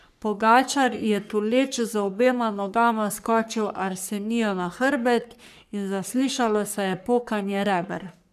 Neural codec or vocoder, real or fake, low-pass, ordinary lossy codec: codec, 44.1 kHz, 3.4 kbps, Pupu-Codec; fake; 14.4 kHz; none